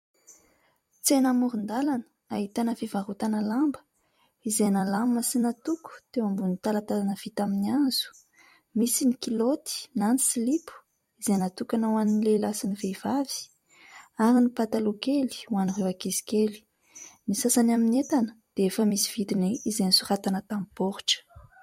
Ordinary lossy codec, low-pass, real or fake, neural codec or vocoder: MP3, 64 kbps; 19.8 kHz; fake; vocoder, 44.1 kHz, 128 mel bands every 256 samples, BigVGAN v2